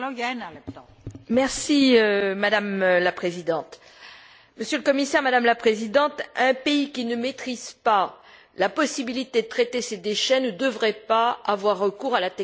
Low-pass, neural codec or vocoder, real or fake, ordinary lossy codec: none; none; real; none